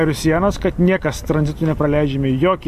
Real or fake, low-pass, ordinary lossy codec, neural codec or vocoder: real; 14.4 kHz; AAC, 96 kbps; none